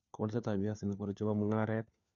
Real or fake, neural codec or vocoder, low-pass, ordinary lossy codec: fake; codec, 16 kHz, 4 kbps, FunCodec, trained on LibriTTS, 50 frames a second; 7.2 kHz; none